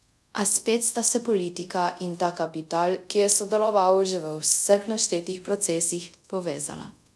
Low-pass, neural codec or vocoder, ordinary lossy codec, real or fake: none; codec, 24 kHz, 0.5 kbps, DualCodec; none; fake